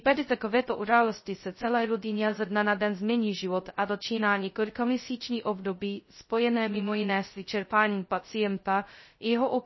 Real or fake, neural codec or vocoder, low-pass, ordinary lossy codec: fake; codec, 16 kHz, 0.2 kbps, FocalCodec; 7.2 kHz; MP3, 24 kbps